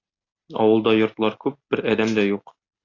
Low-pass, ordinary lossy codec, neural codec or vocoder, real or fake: 7.2 kHz; AAC, 48 kbps; none; real